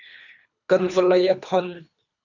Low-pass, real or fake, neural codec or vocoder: 7.2 kHz; fake; codec, 24 kHz, 3 kbps, HILCodec